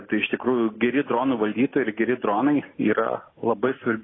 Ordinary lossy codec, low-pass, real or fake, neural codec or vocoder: AAC, 16 kbps; 7.2 kHz; real; none